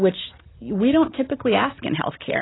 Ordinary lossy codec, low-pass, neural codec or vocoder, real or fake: AAC, 16 kbps; 7.2 kHz; none; real